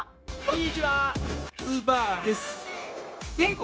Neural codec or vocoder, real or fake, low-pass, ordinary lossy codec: codec, 16 kHz, 0.9 kbps, LongCat-Audio-Codec; fake; none; none